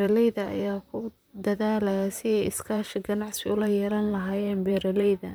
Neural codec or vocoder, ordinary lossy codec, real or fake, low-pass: vocoder, 44.1 kHz, 128 mel bands, Pupu-Vocoder; none; fake; none